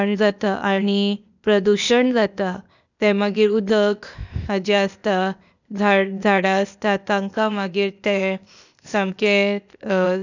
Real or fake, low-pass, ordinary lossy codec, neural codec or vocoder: fake; 7.2 kHz; none; codec, 16 kHz, 0.8 kbps, ZipCodec